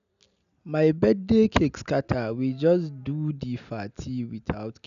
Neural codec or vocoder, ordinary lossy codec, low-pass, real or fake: none; none; 7.2 kHz; real